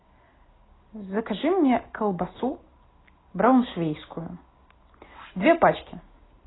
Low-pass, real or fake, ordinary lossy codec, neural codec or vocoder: 7.2 kHz; real; AAC, 16 kbps; none